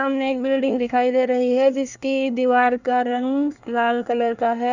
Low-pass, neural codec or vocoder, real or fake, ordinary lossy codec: 7.2 kHz; codec, 24 kHz, 1 kbps, SNAC; fake; none